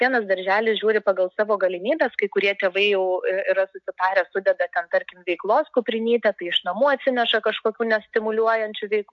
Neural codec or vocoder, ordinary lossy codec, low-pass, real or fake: none; AAC, 64 kbps; 7.2 kHz; real